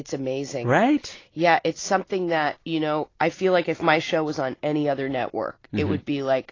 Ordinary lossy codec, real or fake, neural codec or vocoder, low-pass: AAC, 32 kbps; real; none; 7.2 kHz